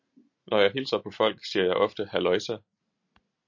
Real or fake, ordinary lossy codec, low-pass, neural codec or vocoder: real; MP3, 48 kbps; 7.2 kHz; none